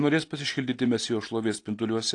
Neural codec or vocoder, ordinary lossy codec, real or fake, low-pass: none; AAC, 48 kbps; real; 10.8 kHz